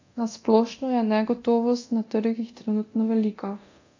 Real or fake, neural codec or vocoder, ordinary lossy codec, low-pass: fake; codec, 24 kHz, 0.9 kbps, DualCodec; AAC, 48 kbps; 7.2 kHz